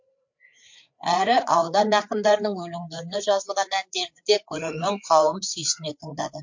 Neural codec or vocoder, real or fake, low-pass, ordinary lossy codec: codec, 16 kHz, 4 kbps, FreqCodec, larger model; fake; 7.2 kHz; MP3, 48 kbps